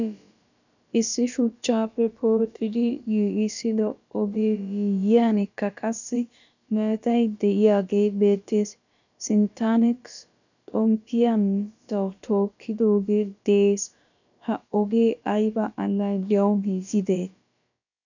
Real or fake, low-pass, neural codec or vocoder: fake; 7.2 kHz; codec, 16 kHz, about 1 kbps, DyCAST, with the encoder's durations